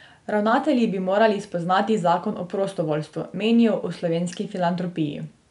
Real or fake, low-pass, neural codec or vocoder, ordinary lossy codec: real; 10.8 kHz; none; none